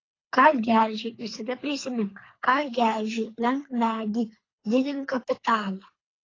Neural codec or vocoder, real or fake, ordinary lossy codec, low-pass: codec, 24 kHz, 3 kbps, HILCodec; fake; AAC, 32 kbps; 7.2 kHz